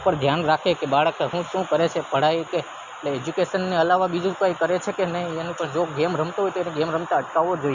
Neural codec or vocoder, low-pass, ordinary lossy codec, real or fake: none; 7.2 kHz; Opus, 64 kbps; real